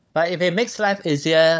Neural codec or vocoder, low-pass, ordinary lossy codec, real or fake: codec, 16 kHz, 16 kbps, FunCodec, trained on LibriTTS, 50 frames a second; none; none; fake